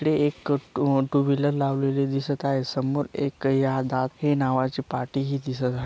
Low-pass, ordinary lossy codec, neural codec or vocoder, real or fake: none; none; none; real